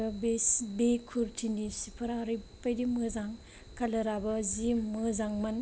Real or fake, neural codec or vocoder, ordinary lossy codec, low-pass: real; none; none; none